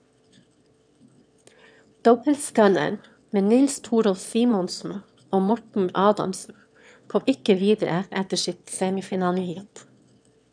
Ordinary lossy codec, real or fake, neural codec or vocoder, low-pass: none; fake; autoencoder, 22.05 kHz, a latent of 192 numbers a frame, VITS, trained on one speaker; 9.9 kHz